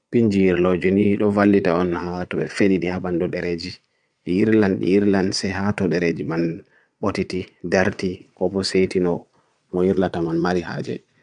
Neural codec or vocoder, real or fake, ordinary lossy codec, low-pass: vocoder, 22.05 kHz, 80 mel bands, Vocos; fake; none; 9.9 kHz